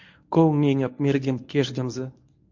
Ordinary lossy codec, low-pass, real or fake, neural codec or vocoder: MP3, 48 kbps; 7.2 kHz; fake; codec, 24 kHz, 0.9 kbps, WavTokenizer, medium speech release version 1